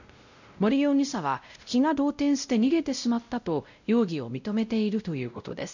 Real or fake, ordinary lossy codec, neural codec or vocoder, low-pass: fake; none; codec, 16 kHz, 0.5 kbps, X-Codec, WavLM features, trained on Multilingual LibriSpeech; 7.2 kHz